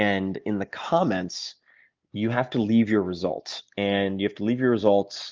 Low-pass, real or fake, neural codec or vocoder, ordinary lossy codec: 7.2 kHz; real; none; Opus, 32 kbps